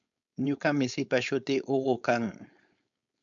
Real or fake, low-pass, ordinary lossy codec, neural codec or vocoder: fake; 7.2 kHz; MP3, 64 kbps; codec, 16 kHz, 4.8 kbps, FACodec